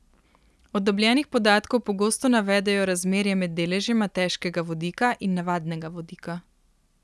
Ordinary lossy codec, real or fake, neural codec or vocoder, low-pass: none; real; none; none